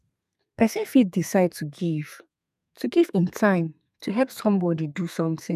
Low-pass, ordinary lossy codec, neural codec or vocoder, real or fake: 14.4 kHz; none; codec, 32 kHz, 1.9 kbps, SNAC; fake